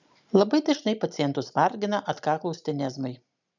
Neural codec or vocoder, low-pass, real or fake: none; 7.2 kHz; real